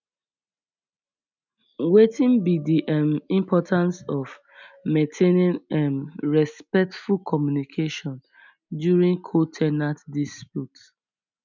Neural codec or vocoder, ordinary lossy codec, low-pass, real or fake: none; none; 7.2 kHz; real